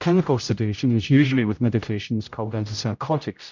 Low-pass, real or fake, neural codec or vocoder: 7.2 kHz; fake; codec, 16 kHz, 0.5 kbps, X-Codec, HuBERT features, trained on general audio